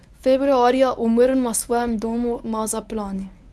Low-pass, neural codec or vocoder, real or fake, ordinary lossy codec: none; codec, 24 kHz, 0.9 kbps, WavTokenizer, medium speech release version 1; fake; none